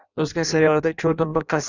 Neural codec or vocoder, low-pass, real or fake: codec, 16 kHz in and 24 kHz out, 0.6 kbps, FireRedTTS-2 codec; 7.2 kHz; fake